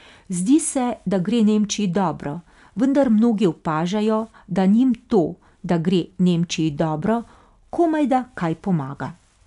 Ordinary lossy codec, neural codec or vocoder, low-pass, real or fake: none; none; 10.8 kHz; real